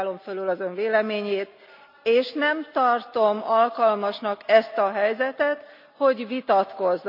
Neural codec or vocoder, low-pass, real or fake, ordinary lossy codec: none; 5.4 kHz; real; none